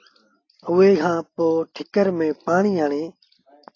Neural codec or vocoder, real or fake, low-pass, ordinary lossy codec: none; real; 7.2 kHz; AAC, 32 kbps